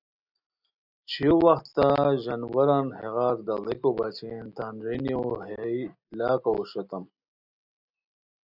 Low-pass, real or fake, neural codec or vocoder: 5.4 kHz; real; none